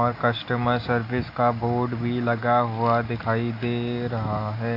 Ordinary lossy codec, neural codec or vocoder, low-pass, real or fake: AAC, 32 kbps; none; 5.4 kHz; real